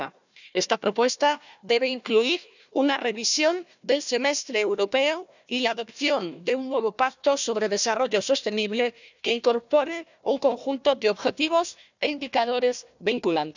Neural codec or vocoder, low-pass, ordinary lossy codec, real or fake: codec, 16 kHz, 1 kbps, FunCodec, trained on Chinese and English, 50 frames a second; 7.2 kHz; none; fake